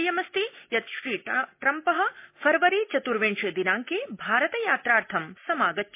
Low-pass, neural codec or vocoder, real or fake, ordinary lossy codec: 3.6 kHz; none; real; MP3, 24 kbps